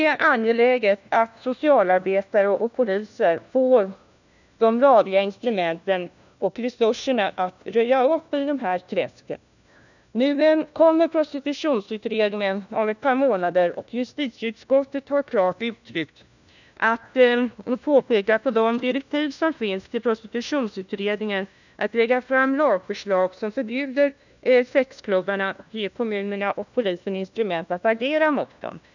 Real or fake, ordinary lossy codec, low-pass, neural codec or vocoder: fake; none; 7.2 kHz; codec, 16 kHz, 1 kbps, FunCodec, trained on LibriTTS, 50 frames a second